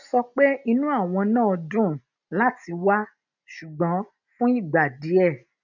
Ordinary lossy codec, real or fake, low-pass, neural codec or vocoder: none; real; 7.2 kHz; none